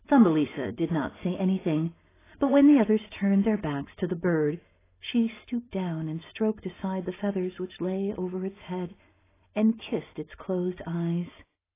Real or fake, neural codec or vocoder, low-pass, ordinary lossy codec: real; none; 3.6 kHz; AAC, 16 kbps